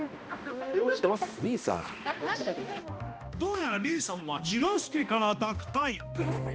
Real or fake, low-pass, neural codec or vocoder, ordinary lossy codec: fake; none; codec, 16 kHz, 1 kbps, X-Codec, HuBERT features, trained on balanced general audio; none